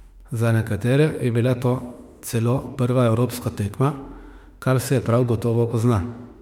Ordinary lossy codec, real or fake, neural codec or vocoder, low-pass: MP3, 96 kbps; fake; autoencoder, 48 kHz, 32 numbers a frame, DAC-VAE, trained on Japanese speech; 19.8 kHz